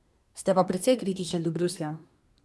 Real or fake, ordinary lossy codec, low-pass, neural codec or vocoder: fake; none; none; codec, 24 kHz, 1 kbps, SNAC